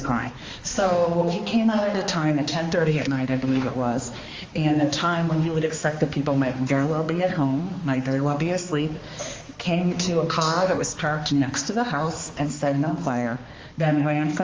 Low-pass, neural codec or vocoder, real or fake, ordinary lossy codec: 7.2 kHz; codec, 16 kHz, 2 kbps, X-Codec, HuBERT features, trained on balanced general audio; fake; Opus, 32 kbps